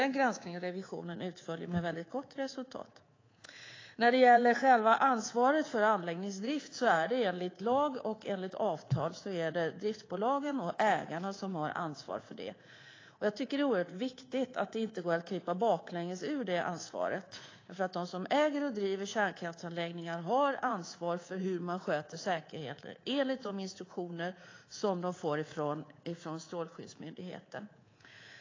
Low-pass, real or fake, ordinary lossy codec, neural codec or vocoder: 7.2 kHz; fake; AAC, 32 kbps; codec, 24 kHz, 3.1 kbps, DualCodec